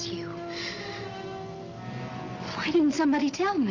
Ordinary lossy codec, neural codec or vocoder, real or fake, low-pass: Opus, 32 kbps; none; real; 7.2 kHz